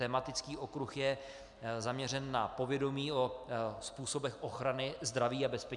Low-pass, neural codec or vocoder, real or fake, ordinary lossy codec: 10.8 kHz; none; real; MP3, 96 kbps